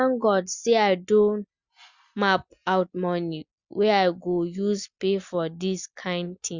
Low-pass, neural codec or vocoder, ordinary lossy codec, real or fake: 7.2 kHz; none; Opus, 64 kbps; real